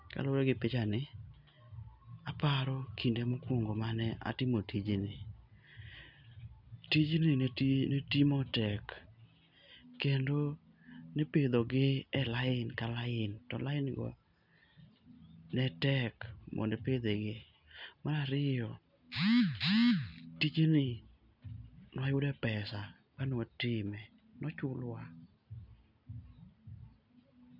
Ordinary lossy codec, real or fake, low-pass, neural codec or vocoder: none; real; 5.4 kHz; none